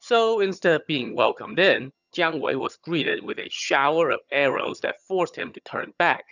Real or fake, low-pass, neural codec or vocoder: fake; 7.2 kHz; vocoder, 22.05 kHz, 80 mel bands, HiFi-GAN